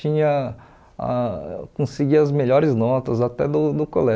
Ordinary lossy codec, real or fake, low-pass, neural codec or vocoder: none; real; none; none